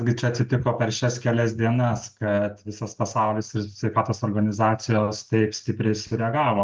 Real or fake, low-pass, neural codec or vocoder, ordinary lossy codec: real; 7.2 kHz; none; Opus, 32 kbps